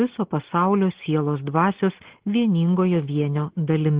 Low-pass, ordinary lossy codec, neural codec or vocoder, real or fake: 3.6 kHz; Opus, 16 kbps; none; real